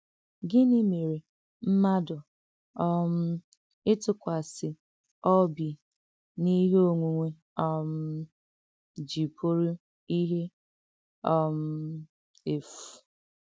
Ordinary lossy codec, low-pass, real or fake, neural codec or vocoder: none; none; real; none